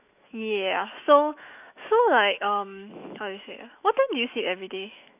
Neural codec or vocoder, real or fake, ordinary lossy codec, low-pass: autoencoder, 48 kHz, 128 numbers a frame, DAC-VAE, trained on Japanese speech; fake; none; 3.6 kHz